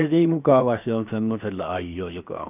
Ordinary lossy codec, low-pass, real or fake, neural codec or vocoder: none; 3.6 kHz; fake; codec, 16 kHz, 0.7 kbps, FocalCodec